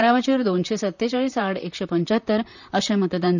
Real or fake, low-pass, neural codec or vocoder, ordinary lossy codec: fake; 7.2 kHz; vocoder, 44.1 kHz, 128 mel bands, Pupu-Vocoder; none